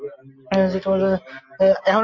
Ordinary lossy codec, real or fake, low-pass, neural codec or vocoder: MP3, 48 kbps; real; 7.2 kHz; none